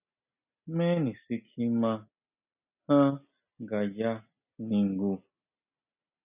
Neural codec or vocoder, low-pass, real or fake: none; 3.6 kHz; real